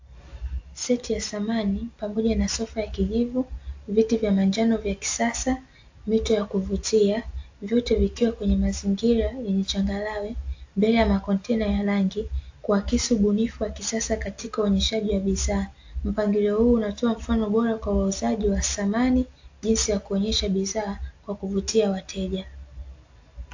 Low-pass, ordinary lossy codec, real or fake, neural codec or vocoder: 7.2 kHz; AAC, 48 kbps; real; none